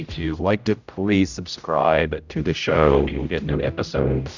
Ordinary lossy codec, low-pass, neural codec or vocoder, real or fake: Opus, 64 kbps; 7.2 kHz; codec, 16 kHz, 0.5 kbps, X-Codec, HuBERT features, trained on general audio; fake